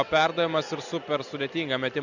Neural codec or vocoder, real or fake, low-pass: none; real; 7.2 kHz